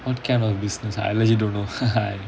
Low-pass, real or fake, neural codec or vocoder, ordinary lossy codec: none; real; none; none